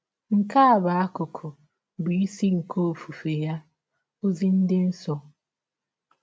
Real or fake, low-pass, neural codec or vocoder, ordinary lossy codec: real; none; none; none